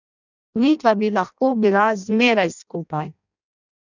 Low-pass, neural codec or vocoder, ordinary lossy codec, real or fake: 7.2 kHz; codec, 16 kHz in and 24 kHz out, 0.6 kbps, FireRedTTS-2 codec; none; fake